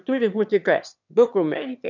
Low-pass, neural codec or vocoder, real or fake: 7.2 kHz; autoencoder, 22.05 kHz, a latent of 192 numbers a frame, VITS, trained on one speaker; fake